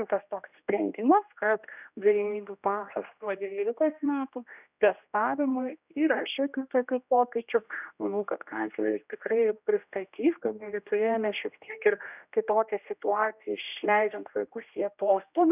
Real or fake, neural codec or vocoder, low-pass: fake; codec, 16 kHz, 1 kbps, X-Codec, HuBERT features, trained on general audio; 3.6 kHz